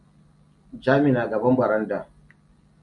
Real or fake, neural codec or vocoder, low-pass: real; none; 10.8 kHz